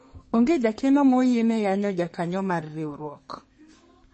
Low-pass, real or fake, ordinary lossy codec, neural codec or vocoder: 10.8 kHz; fake; MP3, 32 kbps; codec, 32 kHz, 1.9 kbps, SNAC